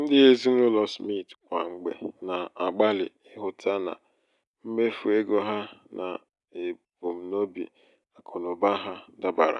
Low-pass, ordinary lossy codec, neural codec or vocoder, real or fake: 10.8 kHz; none; none; real